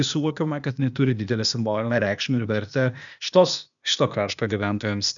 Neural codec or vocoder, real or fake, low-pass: codec, 16 kHz, 0.8 kbps, ZipCodec; fake; 7.2 kHz